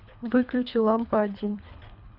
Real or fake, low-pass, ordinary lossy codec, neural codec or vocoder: fake; 5.4 kHz; none; codec, 24 kHz, 3 kbps, HILCodec